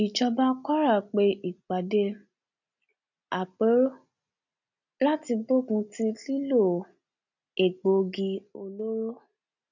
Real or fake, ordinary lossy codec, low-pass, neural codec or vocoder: real; none; 7.2 kHz; none